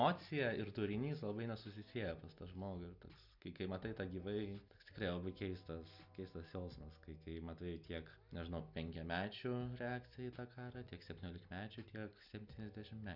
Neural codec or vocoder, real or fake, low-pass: none; real; 5.4 kHz